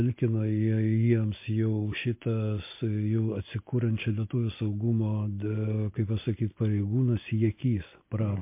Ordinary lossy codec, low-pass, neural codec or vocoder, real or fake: MP3, 24 kbps; 3.6 kHz; none; real